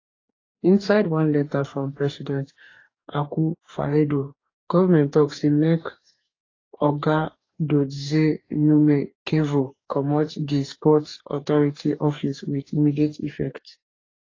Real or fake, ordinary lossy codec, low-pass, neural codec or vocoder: fake; AAC, 32 kbps; 7.2 kHz; codec, 44.1 kHz, 2.6 kbps, DAC